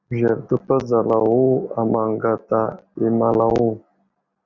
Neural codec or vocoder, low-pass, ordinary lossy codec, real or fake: none; 7.2 kHz; Opus, 64 kbps; real